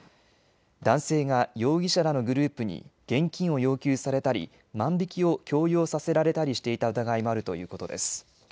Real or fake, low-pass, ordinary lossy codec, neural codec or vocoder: real; none; none; none